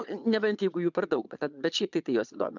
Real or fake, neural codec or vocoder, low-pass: fake; vocoder, 22.05 kHz, 80 mel bands, Vocos; 7.2 kHz